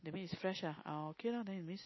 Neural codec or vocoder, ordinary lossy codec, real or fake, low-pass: none; MP3, 24 kbps; real; 7.2 kHz